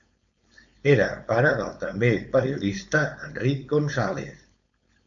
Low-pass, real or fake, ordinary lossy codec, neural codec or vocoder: 7.2 kHz; fake; MP3, 64 kbps; codec, 16 kHz, 4.8 kbps, FACodec